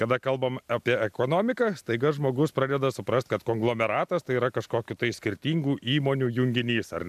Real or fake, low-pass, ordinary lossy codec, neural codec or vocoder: real; 14.4 kHz; MP3, 96 kbps; none